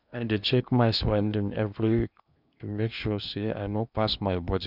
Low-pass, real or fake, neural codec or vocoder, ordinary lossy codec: 5.4 kHz; fake; codec, 16 kHz in and 24 kHz out, 0.8 kbps, FocalCodec, streaming, 65536 codes; none